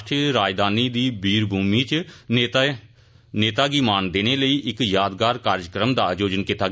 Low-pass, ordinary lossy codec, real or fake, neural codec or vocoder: none; none; real; none